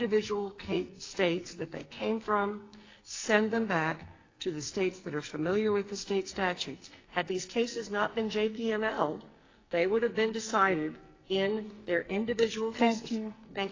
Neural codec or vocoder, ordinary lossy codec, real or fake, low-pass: codec, 44.1 kHz, 2.6 kbps, SNAC; AAC, 32 kbps; fake; 7.2 kHz